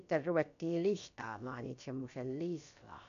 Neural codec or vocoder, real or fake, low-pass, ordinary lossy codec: codec, 16 kHz, about 1 kbps, DyCAST, with the encoder's durations; fake; 7.2 kHz; none